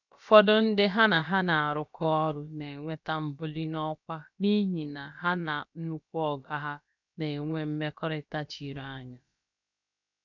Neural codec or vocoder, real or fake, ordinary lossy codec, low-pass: codec, 16 kHz, about 1 kbps, DyCAST, with the encoder's durations; fake; none; 7.2 kHz